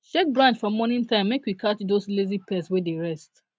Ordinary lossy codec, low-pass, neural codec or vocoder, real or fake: none; none; none; real